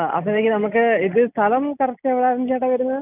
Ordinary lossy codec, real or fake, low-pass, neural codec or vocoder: none; real; 3.6 kHz; none